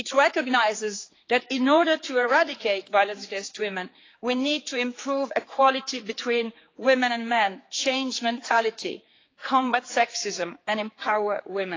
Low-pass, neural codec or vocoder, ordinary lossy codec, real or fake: 7.2 kHz; codec, 16 kHz, 4 kbps, X-Codec, HuBERT features, trained on general audio; AAC, 32 kbps; fake